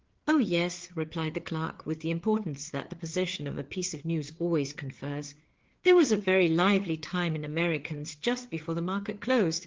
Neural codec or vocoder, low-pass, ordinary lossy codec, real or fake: codec, 16 kHz, 4 kbps, FreqCodec, larger model; 7.2 kHz; Opus, 16 kbps; fake